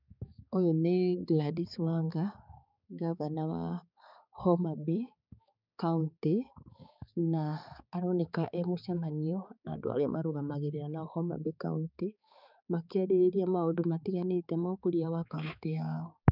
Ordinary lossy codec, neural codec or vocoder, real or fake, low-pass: none; codec, 16 kHz, 4 kbps, X-Codec, HuBERT features, trained on balanced general audio; fake; 5.4 kHz